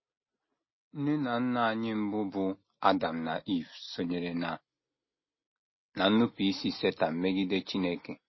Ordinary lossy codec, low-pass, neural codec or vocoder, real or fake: MP3, 24 kbps; 7.2 kHz; none; real